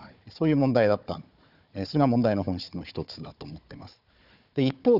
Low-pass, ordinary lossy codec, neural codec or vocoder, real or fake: 5.4 kHz; none; codec, 16 kHz, 4 kbps, FunCodec, trained on Chinese and English, 50 frames a second; fake